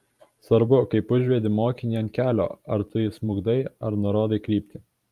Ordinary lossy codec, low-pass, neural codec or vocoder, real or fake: Opus, 32 kbps; 14.4 kHz; vocoder, 44.1 kHz, 128 mel bands every 512 samples, BigVGAN v2; fake